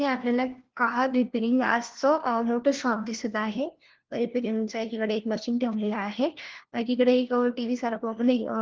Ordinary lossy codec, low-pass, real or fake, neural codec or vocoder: Opus, 16 kbps; 7.2 kHz; fake; codec, 16 kHz, 1 kbps, FunCodec, trained on LibriTTS, 50 frames a second